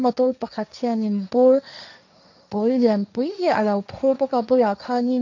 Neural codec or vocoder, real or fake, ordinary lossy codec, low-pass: codec, 16 kHz, 1.1 kbps, Voila-Tokenizer; fake; none; 7.2 kHz